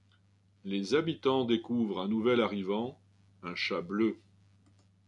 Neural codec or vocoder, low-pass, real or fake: none; 10.8 kHz; real